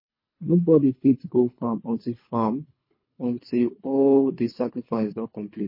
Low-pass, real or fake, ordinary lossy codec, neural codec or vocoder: 5.4 kHz; fake; MP3, 24 kbps; codec, 24 kHz, 3 kbps, HILCodec